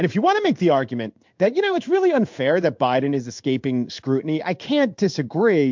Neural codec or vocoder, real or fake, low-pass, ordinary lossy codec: codec, 16 kHz in and 24 kHz out, 1 kbps, XY-Tokenizer; fake; 7.2 kHz; MP3, 64 kbps